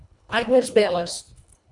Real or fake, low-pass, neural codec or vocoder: fake; 10.8 kHz; codec, 24 kHz, 1.5 kbps, HILCodec